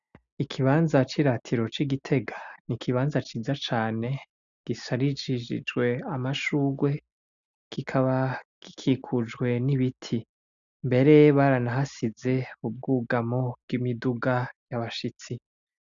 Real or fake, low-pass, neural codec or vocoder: real; 7.2 kHz; none